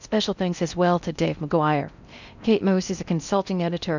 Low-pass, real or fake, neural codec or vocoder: 7.2 kHz; fake; codec, 16 kHz in and 24 kHz out, 0.6 kbps, FocalCodec, streaming, 4096 codes